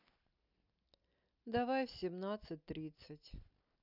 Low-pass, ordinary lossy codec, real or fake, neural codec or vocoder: 5.4 kHz; none; real; none